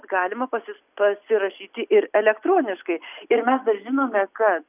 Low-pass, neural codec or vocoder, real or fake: 3.6 kHz; none; real